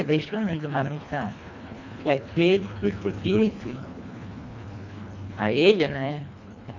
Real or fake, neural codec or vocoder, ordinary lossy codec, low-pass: fake; codec, 24 kHz, 1.5 kbps, HILCodec; none; 7.2 kHz